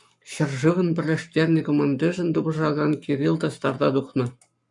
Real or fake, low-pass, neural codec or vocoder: fake; 10.8 kHz; codec, 44.1 kHz, 7.8 kbps, Pupu-Codec